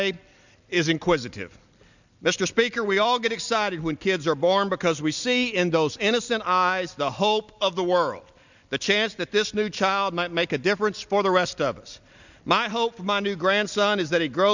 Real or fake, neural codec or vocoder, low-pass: real; none; 7.2 kHz